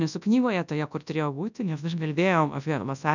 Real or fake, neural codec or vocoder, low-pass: fake; codec, 24 kHz, 0.9 kbps, WavTokenizer, large speech release; 7.2 kHz